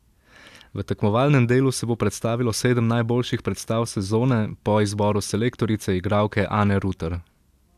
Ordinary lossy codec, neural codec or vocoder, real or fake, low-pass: AAC, 96 kbps; none; real; 14.4 kHz